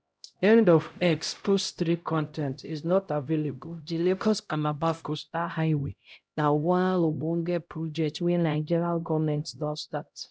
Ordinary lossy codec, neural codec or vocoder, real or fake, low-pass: none; codec, 16 kHz, 0.5 kbps, X-Codec, HuBERT features, trained on LibriSpeech; fake; none